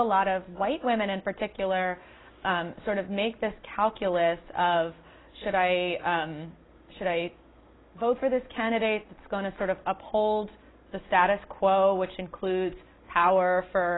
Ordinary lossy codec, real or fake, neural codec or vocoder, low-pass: AAC, 16 kbps; real; none; 7.2 kHz